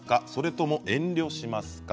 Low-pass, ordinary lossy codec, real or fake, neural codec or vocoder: none; none; real; none